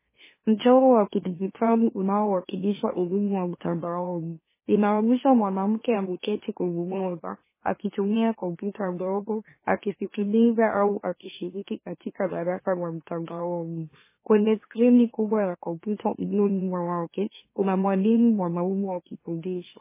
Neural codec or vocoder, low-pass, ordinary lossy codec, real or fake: autoencoder, 44.1 kHz, a latent of 192 numbers a frame, MeloTTS; 3.6 kHz; MP3, 16 kbps; fake